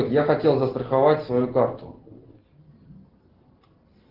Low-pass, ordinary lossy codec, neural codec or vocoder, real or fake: 5.4 kHz; Opus, 16 kbps; none; real